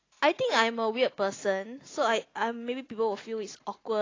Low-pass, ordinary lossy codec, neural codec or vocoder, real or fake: 7.2 kHz; AAC, 32 kbps; none; real